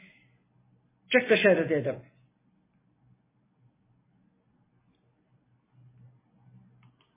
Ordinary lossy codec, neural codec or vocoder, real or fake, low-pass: MP3, 16 kbps; none; real; 3.6 kHz